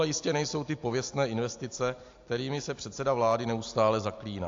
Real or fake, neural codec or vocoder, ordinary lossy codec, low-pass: real; none; AAC, 48 kbps; 7.2 kHz